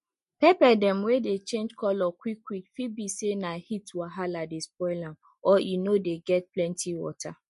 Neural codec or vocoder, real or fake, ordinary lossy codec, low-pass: none; real; MP3, 48 kbps; 14.4 kHz